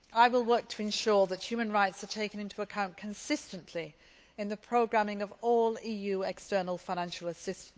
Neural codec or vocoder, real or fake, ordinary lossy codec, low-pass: codec, 16 kHz, 8 kbps, FunCodec, trained on Chinese and English, 25 frames a second; fake; none; none